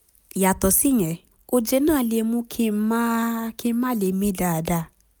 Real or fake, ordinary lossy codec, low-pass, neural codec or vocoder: real; none; none; none